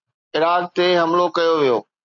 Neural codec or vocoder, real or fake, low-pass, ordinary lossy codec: none; real; 7.2 kHz; MP3, 96 kbps